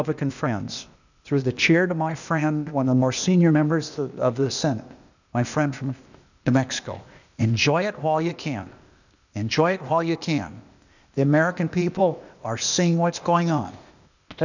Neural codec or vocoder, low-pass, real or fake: codec, 16 kHz, 0.8 kbps, ZipCodec; 7.2 kHz; fake